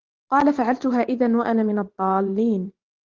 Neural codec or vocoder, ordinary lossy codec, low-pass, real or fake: vocoder, 44.1 kHz, 128 mel bands every 512 samples, BigVGAN v2; Opus, 16 kbps; 7.2 kHz; fake